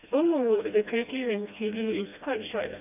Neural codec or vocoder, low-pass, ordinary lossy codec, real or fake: codec, 16 kHz, 1 kbps, FreqCodec, smaller model; 3.6 kHz; none; fake